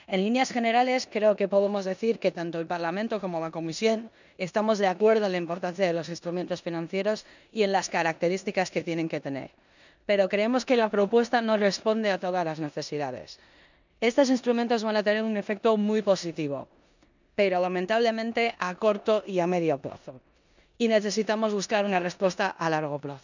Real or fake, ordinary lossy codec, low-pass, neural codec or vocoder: fake; none; 7.2 kHz; codec, 16 kHz in and 24 kHz out, 0.9 kbps, LongCat-Audio-Codec, four codebook decoder